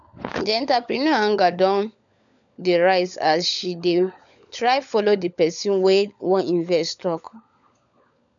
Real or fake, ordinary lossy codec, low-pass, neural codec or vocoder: fake; none; 7.2 kHz; codec, 16 kHz, 16 kbps, FunCodec, trained on LibriTTS, 50 frames a second